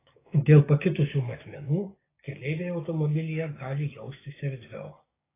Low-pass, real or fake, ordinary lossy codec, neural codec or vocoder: 3.6 kHz; fake; AAC, 16 kbps; codec, 16 kHz, 6 kbps, DAC